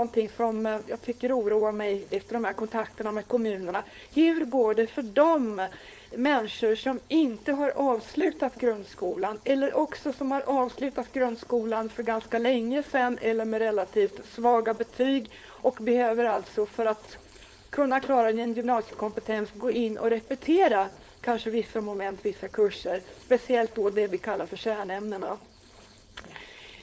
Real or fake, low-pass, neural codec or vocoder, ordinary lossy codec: fake; none; codec, 16 kHz, 4.8 kbps, FACodec; none